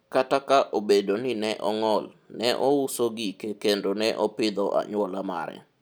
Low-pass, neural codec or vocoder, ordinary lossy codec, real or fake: none; none; none; real